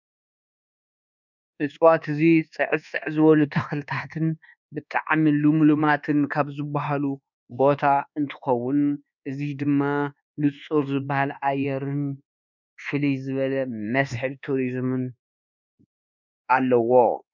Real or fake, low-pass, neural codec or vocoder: fake; 7.2 kHz; codec, 24 kHz, 1.2 kbps, DualCodec